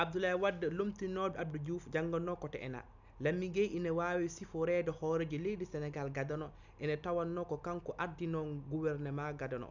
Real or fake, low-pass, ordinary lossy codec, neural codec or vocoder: real; 7.2 kHz; none; none